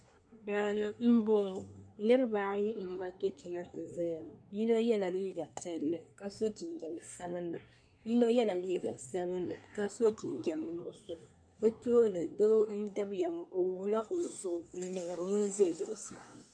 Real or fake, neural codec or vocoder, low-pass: fake; codec, 24 kHz, 1 kbps, SNAC; 9.9 kHz